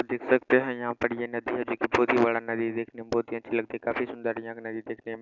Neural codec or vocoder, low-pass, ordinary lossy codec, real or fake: none; 7.2 kHz; none; real